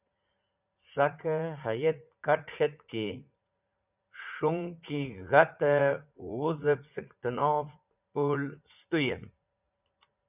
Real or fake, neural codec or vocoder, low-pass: fake; vocoder, 44.1 kHz, 80 mel bands, Vocos; 3.6 kHz